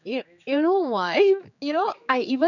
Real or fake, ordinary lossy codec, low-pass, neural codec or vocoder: fake; none; 7.2 kHz; codec, 16 kHz, 4 kbps, X-Codec, HuBERT features, trained on general audio